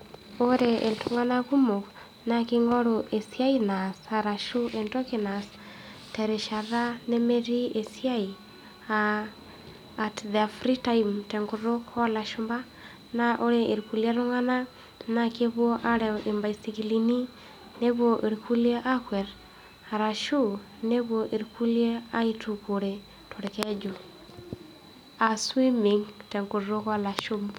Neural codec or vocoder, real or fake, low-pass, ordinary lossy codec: none; real; 19.8 kHz; none